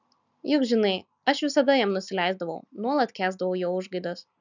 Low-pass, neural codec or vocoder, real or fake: 7.2 kHz; none; real